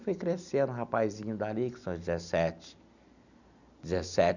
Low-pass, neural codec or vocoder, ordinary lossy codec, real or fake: 7.2 kHz; none; none; real